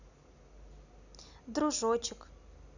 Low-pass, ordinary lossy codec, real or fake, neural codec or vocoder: 7.2 kHz; none; real; none